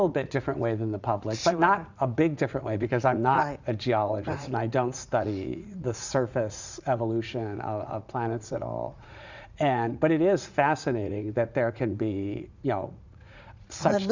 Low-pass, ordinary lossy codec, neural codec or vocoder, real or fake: 7.2 kHz; Opus, 64 kbps; vocoder, 44.1 kHz, 80 mel bands, Vocos; fake